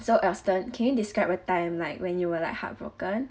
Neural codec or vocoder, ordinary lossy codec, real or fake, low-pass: none; none; real; none